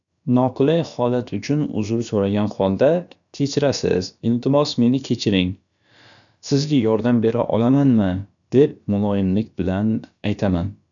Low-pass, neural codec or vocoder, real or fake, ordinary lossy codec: 7.2 kHz; codec, 16 kHz, about 1 kbps, DyCAST, with the encoder's durations; fake; none